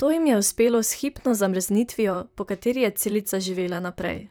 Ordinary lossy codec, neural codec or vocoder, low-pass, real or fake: none; vocoder, 44.1 kHz, 128 mel bands, Pupu-Vocoder; none; fake